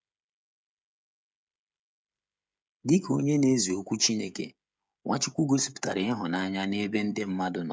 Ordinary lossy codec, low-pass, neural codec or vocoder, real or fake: none; none; codec, 16 kHz, 16 kbps, FreqCodec, smaller model; fake